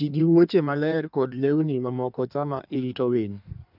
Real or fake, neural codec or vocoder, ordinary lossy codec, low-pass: fake; codec, 16 kHz in and 24 kHz out, 1.1 kbps, FireRedTTS-2 codec; none; 5.4 kHz